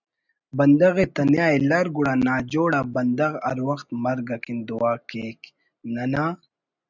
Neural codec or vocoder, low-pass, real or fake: none; 7.2 kHz; real